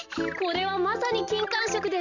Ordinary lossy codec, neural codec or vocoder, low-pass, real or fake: none; none; 7.2 kHz; real